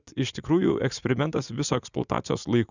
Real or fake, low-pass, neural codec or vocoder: fake; 7.2 kHz; vocoder, 44.1 kHz, 80 mel bands, Vocos